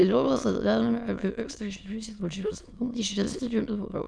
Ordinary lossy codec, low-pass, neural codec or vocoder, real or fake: Opus, 64 kbps; 9.9 kHz; autoencoder, 22.05 kHz, a latent of 192 numbers a frame, VITS, trained on many speakers; fake